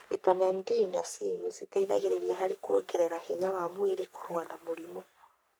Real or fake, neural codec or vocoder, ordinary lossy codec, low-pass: fake; codec, 44.1 kHz, 3.4 kbps, Pupu-Codec; none; none